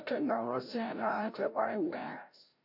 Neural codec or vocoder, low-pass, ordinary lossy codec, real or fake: codec, 16 kHz, 0.5 kbps, FreqCodec, larger model; 5.4 kHz; AAC, 48 kbps; fake